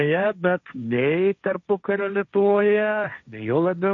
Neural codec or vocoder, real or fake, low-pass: codec, 16 kHz, 1.1 kbps, Voila-Tokenizer; fake; 7.2 kHz